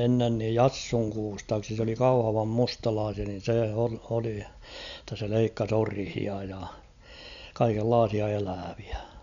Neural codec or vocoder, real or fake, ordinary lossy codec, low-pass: none; real; none; 7.2 kHz